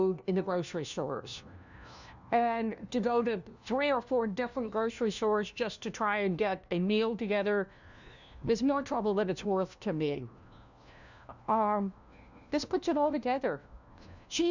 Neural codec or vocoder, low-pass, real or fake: codec, 16 kHz, 1 kbps, FunCodec, trained on LibriTTS, 50 frames a second; 7.2 kHz; fake